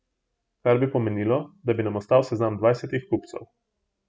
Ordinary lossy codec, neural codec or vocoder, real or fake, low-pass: none; none; real; none